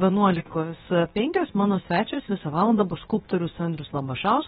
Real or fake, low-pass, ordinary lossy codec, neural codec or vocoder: fake; 7.2 kHz; AAC, 16 kbps; codec, 16 kHz, about 1 kbps, DyCAST, with the encoder's durations